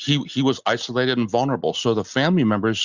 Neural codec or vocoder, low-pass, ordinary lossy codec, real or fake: none; 7.2 kHz; Opus, 64 kbps; real